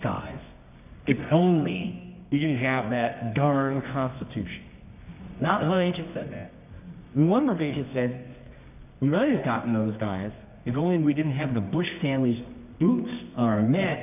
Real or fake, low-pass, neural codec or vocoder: fake; 3.6 kHz; codec, 24 kHz, 0.9 kbps, WavTokenizer, medium music audio release